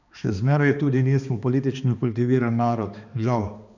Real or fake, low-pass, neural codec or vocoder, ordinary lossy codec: fake; 7.2 kHz; codec, 16 kHz, 2 kbps, X-Codec, HuBERT features, trained on balanced general audio; none